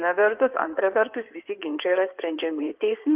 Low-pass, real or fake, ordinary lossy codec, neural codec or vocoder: 3.6 kHz; fake; Opus, 32 kbps; codec, 16 kHz in and 24 kHz out, 2.2 kbps, FireRedTTS-2 codec